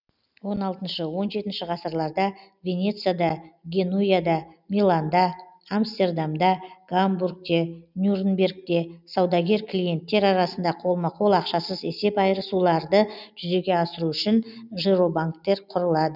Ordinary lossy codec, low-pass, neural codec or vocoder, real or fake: none; 5.4 kHz; none; real